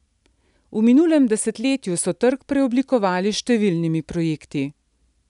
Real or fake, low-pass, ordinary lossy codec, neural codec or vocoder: real; 10.8 kHz; none; none